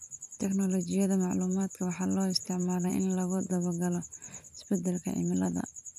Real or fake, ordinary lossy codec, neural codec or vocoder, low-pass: fake; none; vocoder, 44.1 kHz, 128 mel bands every 256 samples, BigVGAN v2; 14.4 kHz